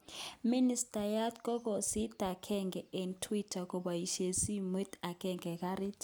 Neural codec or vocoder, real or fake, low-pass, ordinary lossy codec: none; real; none; none